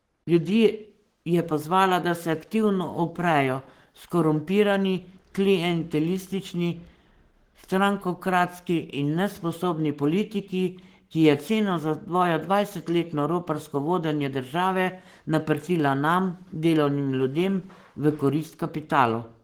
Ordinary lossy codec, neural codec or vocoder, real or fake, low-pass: Opus, 16 kbps; codec, 44.1 kHz, 7.8 kbps, Pupu-Codec; fake; 19.8 kHz